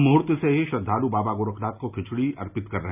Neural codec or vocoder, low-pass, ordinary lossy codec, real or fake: none; 3.6 kHz; none; real